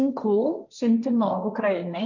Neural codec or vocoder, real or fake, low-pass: codec, 16 kHz, 1.1 kbps, Voila-Tokenizer; fake; 7.2 kHz